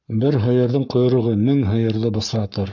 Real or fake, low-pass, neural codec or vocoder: fake; 7.2 kHz; codec, 44.1 kHz, 7.8 kbps, Pupu-Codec